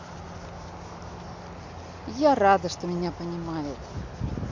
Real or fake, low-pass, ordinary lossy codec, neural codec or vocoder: real; 7.2 kHz; MP3, 48 kbps; none